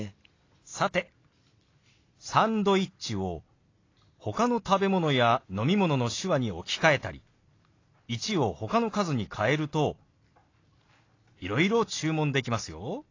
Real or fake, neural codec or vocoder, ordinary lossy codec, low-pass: real; none; AAC, 32 kbps; 7.2 kHz